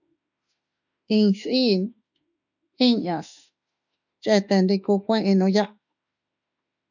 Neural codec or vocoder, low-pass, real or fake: autoencoder, 48 kHz, 32 numbers a frame, DAC-VAE, trained on Japanese speech; 7.2 kHz; fake